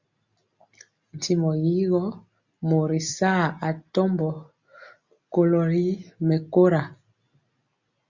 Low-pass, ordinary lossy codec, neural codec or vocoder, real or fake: 7.2 kHz; Opus, 64 kbps; none; real